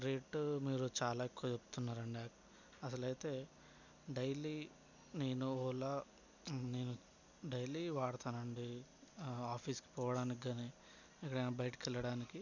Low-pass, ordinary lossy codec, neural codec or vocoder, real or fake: 7.2 kHz; none; none; real